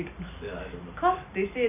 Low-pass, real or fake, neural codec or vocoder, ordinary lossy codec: 3.6 kHz; real; none; none